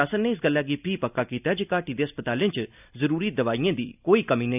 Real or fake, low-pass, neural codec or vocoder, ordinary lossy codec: real; 3.6 kHz; none; none